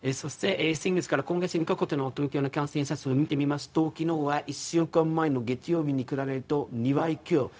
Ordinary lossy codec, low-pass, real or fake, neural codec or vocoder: none; none; fake; codec, 16 kHz, 0.4 kbps, LongCat-Audio-Codec